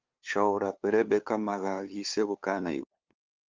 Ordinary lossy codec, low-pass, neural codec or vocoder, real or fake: Opus, 24 kbps; 7.2 kHz; codec, 16 kHz, 2 kbps, FunCodec, trained on LibriTTS, 25 frames a second; fake